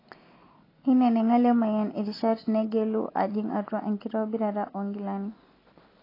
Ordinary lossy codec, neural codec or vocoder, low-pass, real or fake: AAC, 24 kbps; none; 5.4 kHz; real